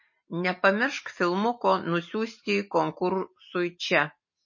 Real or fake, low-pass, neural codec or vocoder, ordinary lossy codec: real; 7.2 kHz; none; MP3, 32 kbps